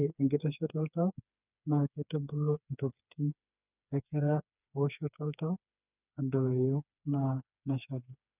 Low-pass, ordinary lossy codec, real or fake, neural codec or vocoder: 3.6 kHz; none; fake; codec, 16 kHz, 4 kbps, FreqCodec, smaller model